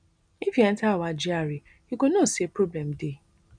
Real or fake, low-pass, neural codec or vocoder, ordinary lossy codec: real; 9.9 kHz; none; none